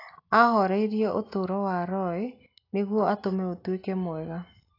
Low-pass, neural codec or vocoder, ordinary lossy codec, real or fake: 5.4 kHz; none; AAC, 32 kbps; real